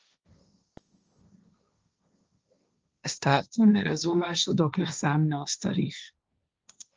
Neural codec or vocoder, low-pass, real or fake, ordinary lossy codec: codec, 16 kHz, 1.1 kbps, Voila-Tokenizer; 7.2 kHz; fake; Opus, 24 kbps